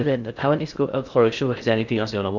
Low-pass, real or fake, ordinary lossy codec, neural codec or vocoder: 7.2 kHz; fake; none; codec, 16 kHz in and 24 kHz out, 0.6 kbps, FocalCodec, streaming, 2048 codes